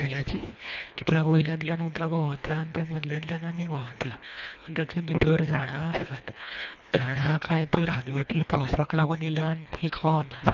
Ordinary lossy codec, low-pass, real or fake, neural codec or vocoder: none; 7.2 kHz; fake; codec, 24 kHz, 1.5 kbps, HILCodec